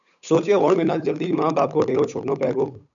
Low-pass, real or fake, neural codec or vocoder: 7.2 kHz; fake; codec, 16 kHz, 8 kbps, FunCodec, trained on Chinese and English, 25 frames a second